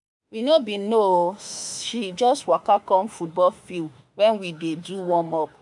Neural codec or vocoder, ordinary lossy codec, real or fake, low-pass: autoencoder, 48 kHz, 32 numbers a frame, DAC-VAE, trained on Japanese speech; none; fake; 10.8 kHz